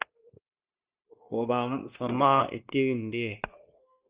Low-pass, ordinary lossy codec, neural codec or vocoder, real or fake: 3.6 kHz; Opus, 32 kbps; codec, 16 kHz, 0.9 kbps, LongCat-Audio-Codec; fake